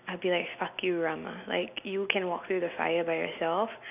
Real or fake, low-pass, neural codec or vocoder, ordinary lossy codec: real; 3.6 kHz; none; none